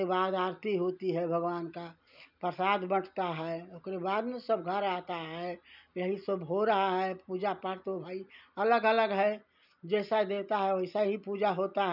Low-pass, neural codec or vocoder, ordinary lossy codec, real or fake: 5.4 kHz; none; none; real